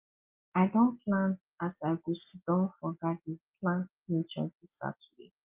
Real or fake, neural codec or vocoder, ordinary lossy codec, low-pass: real; none; Opus, 24 kbps; 3.6 kHz